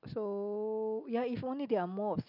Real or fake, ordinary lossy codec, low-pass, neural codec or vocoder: real; none; 5.4 kHz; none